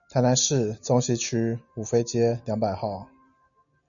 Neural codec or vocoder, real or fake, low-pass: none; real; 7.2 kHz